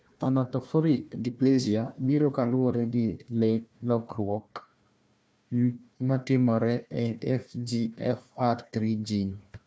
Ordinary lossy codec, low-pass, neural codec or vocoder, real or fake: none; none; codec, 16 kHz, 1 kbps, FunCodec, trained on Chinese and English, 50 frames a second; fake